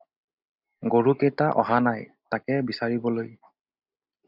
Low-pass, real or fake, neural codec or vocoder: 5.4 kHz; real; none